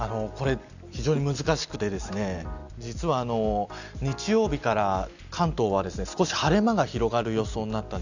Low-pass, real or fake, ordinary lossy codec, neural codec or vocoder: 7.2 kHz; real; none; none